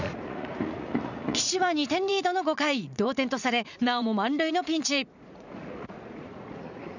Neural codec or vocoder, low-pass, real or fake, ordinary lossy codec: vocoder, 44.1 kHz, 80 mel bands, Vocos; 7.2 kHz; fake; none